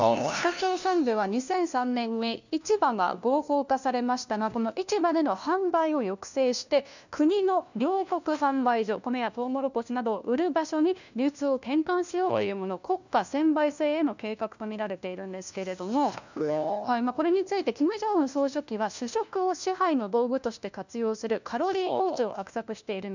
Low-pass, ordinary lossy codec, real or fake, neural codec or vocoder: 7.2 kHz; none; fake; codec, 16 kHz, 1 kbps, FunCodec, trained on LibriTTS, 50 frames a second